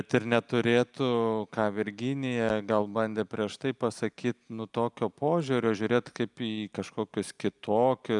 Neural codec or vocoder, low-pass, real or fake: none; 10.8 kHz; real